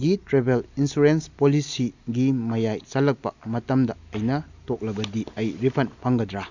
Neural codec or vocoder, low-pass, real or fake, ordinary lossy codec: none; 7.2 kHz; real; none